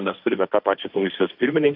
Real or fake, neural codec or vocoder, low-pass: fake; codec, 16 kHz, 1.1 kbps, Voila-Tokenizer; 5.4 kHz